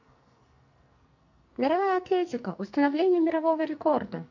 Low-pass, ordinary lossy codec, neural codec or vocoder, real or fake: 7.2 kHz; MP3, 48 kbps; codec, 44.1 kHz, 2.6 kbps, SNAC; fake